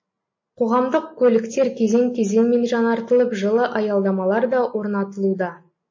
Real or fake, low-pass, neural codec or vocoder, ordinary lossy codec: real; 7.2 kHz; none; MP3, 32 kbps